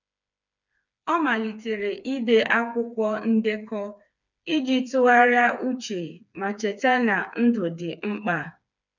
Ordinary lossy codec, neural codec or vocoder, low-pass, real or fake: none; codec, 16 kHz, 4 kbps, FreqCodec, smaller model; 7.2 kHz; fake